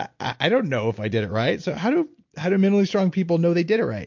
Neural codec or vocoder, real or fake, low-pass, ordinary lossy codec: none; real; 7.2 kHz; MP3, 48 kbps